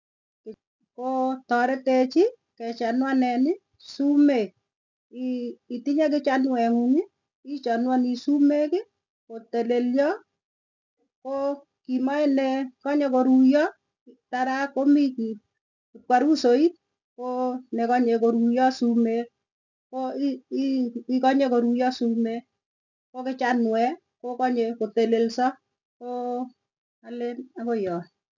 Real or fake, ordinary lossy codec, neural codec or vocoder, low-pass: real; none; none; 7.2 kHz